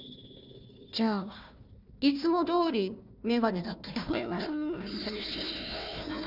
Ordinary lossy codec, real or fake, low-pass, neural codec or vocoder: none; fake; 5.4 kHz; codec, 16 kHz, 1 kbps, FunCodec, trained on Chinese and English, 50 frames a second